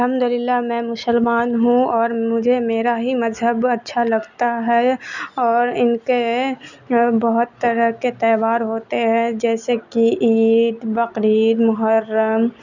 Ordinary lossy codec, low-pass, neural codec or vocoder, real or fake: none; 7.2 kHz; none; real